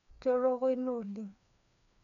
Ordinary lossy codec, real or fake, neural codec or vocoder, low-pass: none; fake; codec, 16 kHz, 2 kbps, FreqCodec, larger model; 7.2 kHz